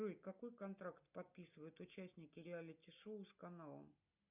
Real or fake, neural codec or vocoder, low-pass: real; none; 3.6 kHz